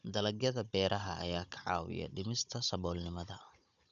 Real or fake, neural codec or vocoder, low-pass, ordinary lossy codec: fake; codec, 16 kHz, 16 kbps, FunCodec, trained on Chinese and English, 50 frames a second; 7.2 kHz; none